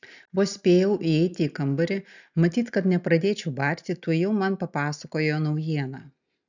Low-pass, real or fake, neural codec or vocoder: 7.2 kHz; real; none